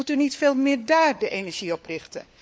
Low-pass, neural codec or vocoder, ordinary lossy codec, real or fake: none; codec, 16 kHz, 4 kbps, FunCodec, trained on LibriTTS, 50 frames a second; none; fake